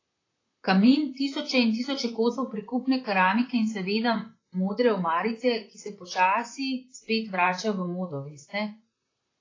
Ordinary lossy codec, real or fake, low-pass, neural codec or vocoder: AAC, 32 kbps; fake; 7.2 kHz; vocoder, 44.1 kHz, 128 mel bands, Pupu-Vocoder